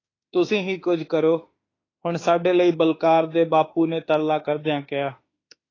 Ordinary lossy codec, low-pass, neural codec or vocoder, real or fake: AAC, 32 kbps; 7.2 kHz; autoencoder, 48 kHz, 32 numbers a frame, DAC-VAE, trained on Japanese speech; fake